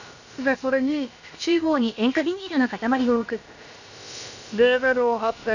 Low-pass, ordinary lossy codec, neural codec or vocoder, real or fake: 7.2 kHz; none; codec, 16 kHz, about 1 kbps, DyCAST, with the encoder's durations; fake